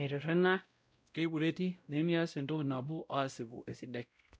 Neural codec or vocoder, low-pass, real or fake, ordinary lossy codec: codec, 16 kHz, 0.5 kbps, X-Codec, WavLM features, trained on Multilingual LibriSpeech; none; fake; none